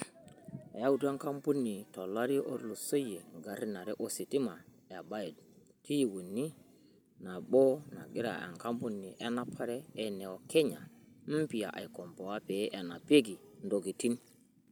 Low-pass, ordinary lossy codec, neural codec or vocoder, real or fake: none; none; none; real